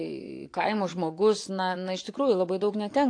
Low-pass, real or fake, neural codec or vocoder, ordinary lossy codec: 9.9 kHz; fake; vocoder, 24 kHz, 100 mel bands, Vocos; AAC, 48 kbps